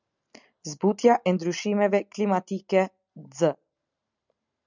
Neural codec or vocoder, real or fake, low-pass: none; real; 7.2 kHz